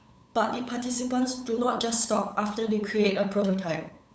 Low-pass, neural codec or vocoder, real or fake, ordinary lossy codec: none; codec, 16 kHz, 8 kbps, FunCodec, trained on LibriTTS, 25 frames a second; fake; none